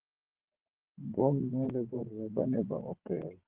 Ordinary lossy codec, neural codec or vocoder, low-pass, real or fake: Opus, 16 kbps; vocoder, 22.05 kHz, 80 mel bands, WaveNeXt; 3.6 kHz; fake